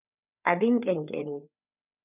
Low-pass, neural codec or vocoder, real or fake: 3.6 kHz; codec, 16 kHz, 4 kbps, FreqCodec, larger model; fake